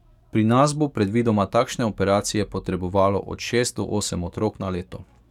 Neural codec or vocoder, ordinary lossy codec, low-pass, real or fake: codec, 44.1 kHz, 7.8 kbps, DAC; none; 19.8 kHz; fake